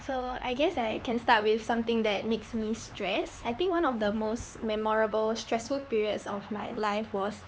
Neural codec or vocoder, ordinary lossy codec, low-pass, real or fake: codec, 16 kHz, 4 kbps, X-Codec, HuBERT features, trained on LibriSpeech; none; none; fake